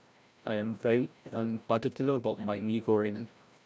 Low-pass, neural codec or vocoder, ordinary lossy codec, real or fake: none; codec, 16 kHz, 0.5 kbps, FreqCodec, larger model; none; fake